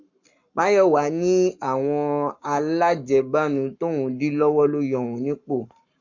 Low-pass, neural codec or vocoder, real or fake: 7.2 kHz; codec, 44.1 kHz, 7.8 kbps, Pupu-Codec; fake